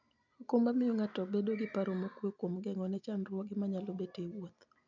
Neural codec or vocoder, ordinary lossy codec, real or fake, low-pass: none; none; real; 7.2 kHz